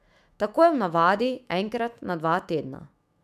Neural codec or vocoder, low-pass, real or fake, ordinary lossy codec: autoencoder, 48 kHz, 128 numbers a frame, DAC-VAE, trained on Japanese speech; 14.4 kHz; fake; none